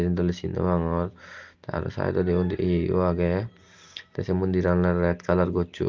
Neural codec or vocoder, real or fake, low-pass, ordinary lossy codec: none; real; 7.2 kHz; Opus, 32 kbps